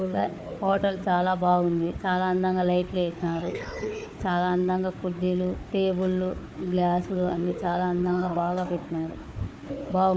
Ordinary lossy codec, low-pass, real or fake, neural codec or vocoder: none; none; fake; codec, 16 kHz, 4 kbps, FunCodec, trained on Chinese and English, 50 frames a second